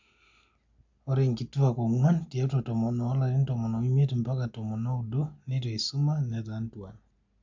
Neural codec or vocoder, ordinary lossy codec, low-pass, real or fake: none; MP3, 64 kbps; 7.2 kHz; real